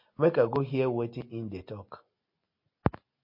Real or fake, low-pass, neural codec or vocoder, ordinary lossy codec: real; 5.4 kHz; none; MP3, 32 kbps